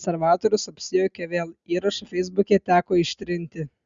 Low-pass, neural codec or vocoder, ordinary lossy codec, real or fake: 7.2 kHz; none; Opus, 64 kbps; real